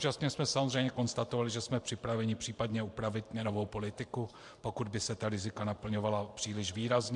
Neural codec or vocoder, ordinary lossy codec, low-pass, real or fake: vocoder, 44.1 kHz, 128 mel bands every 512 samples, BigVGAN v2; MP3, 64 kbps; 10.8 kHz; fake